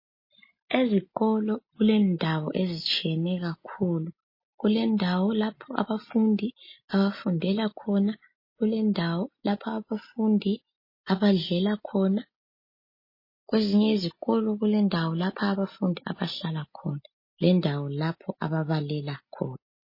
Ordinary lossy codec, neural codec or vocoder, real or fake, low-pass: MP3, 24 kbps; none; real; 5.4 kHz